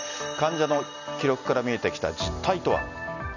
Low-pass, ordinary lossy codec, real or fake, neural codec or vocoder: 7.2 kHz; none; real; none